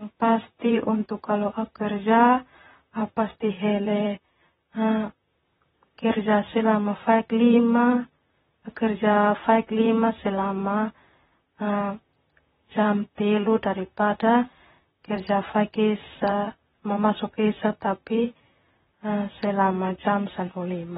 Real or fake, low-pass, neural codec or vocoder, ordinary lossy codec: fake; 19.8 kHz; vocoder, 44.1 kHz, 128 mel bands, Pupu-Vocoder; AAC, 16 kbps